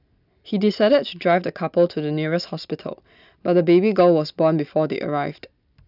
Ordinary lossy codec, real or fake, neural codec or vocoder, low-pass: none; real; none; 5.4 kHz